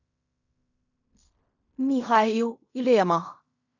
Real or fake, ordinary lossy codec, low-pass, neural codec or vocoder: fake; none; 7.2 kHz; codec, 16 kHz in and 24 kHz out, 0.4 kbps, LongCat-Audio-Codec, fine tuned four codebook decoder